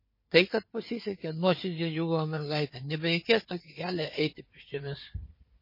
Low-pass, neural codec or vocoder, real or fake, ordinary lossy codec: 5.4 kHz; codec, 16 kHz, 4 kbps, FunCodec, trained on Chinese and English, 50 frames a second; fake; MP3, 24 kbps